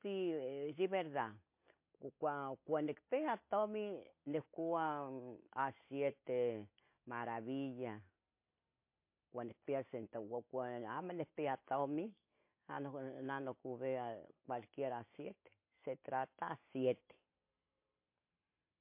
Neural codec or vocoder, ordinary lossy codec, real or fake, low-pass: none; MP3, 24 kbps; real; 3.6 kHz